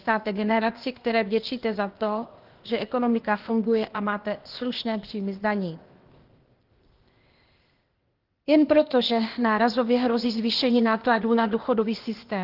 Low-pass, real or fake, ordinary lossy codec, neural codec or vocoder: 5.4 kHz; fake; Opus, 16 kbps; codec, 16 kHz, 0.8 kbps, ZipCodec